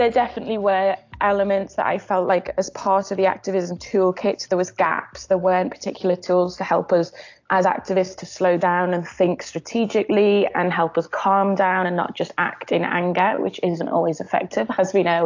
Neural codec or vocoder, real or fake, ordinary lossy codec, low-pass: none; real; AAC, 48 kbps; 7.2 kHz